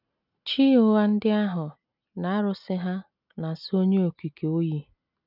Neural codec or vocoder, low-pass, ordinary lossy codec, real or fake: none; 5.4 kHz; none; real